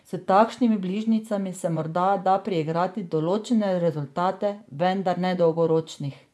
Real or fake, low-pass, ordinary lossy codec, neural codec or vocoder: fake; none; none; vocoder, 24 kHz, 100 mel bands, Vocos